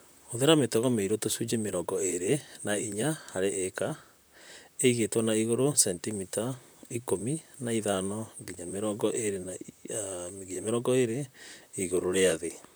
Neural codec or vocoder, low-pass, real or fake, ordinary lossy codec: vocoder, 44.1 kHz, 128 mel bands, Pupu-Vocoder; none; fake; none